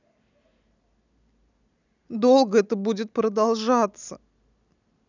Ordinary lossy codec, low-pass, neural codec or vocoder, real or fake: none; 7.2 kHz; none; real